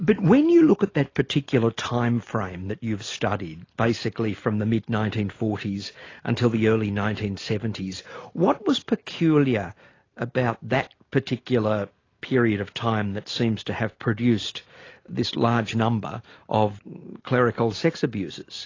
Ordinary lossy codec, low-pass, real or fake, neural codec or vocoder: AAC, 32 kbps; 7.2 kHz; real; none